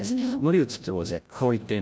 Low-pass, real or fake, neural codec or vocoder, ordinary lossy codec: none; fake; codec, 16 kHz, 0.5 kbps, FreqCodec, larger model; none